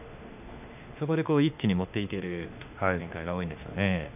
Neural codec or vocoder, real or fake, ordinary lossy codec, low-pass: autoencoder, 48 kHz, 32 numbers a frame, DAC-VAE, trained on Japanese speech; fake; none; 3.6 kHz